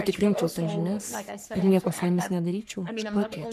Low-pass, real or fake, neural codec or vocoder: 14.4 kHz; fake; codec, 44.1 kHz, 3.4 kbps, Pupu-Codec